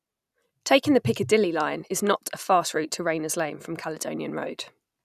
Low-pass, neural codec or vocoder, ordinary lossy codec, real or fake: 14.4 kHz; none; none; real